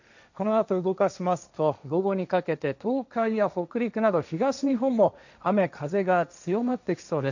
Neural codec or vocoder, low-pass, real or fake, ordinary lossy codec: codec, 16 kHz, 1.1 kbps, Voila-Tokenizer; 7.2 kHz; fake; none